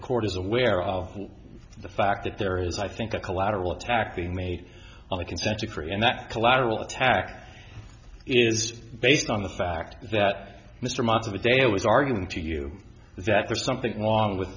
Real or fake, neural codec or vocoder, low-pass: real; none; 7.2 kHz